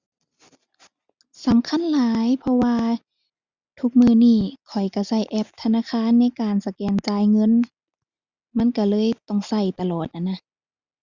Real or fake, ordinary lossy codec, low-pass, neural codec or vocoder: real; Opus, 64 kbps; 7.2 kHz; none